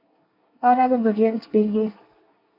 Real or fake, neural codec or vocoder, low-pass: fake; codec, 24 kHz, 1 kbps, SNAC; 5.4 kHz